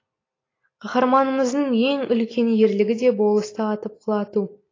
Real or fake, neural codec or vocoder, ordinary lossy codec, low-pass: real; none; AAC, 32 kbps; 7.2 kHz